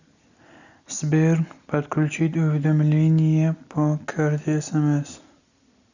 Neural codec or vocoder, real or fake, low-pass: none; real; 7.2 kHz